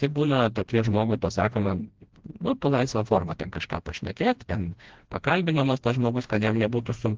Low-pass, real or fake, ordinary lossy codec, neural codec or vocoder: 7.2 kHz; fake; Opus, 16 kbps; codec, 16 kHz, 1 kbps, FreqCodec, smaller model